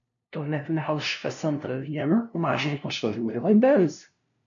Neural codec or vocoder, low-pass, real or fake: codec, 16 kHz, 0.5 kbps, FunCodec, trained on LibriTTS, 25 frames a second; 7.2 kHz; fake